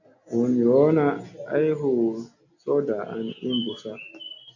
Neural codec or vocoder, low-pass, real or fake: none; 7.2 kHz; real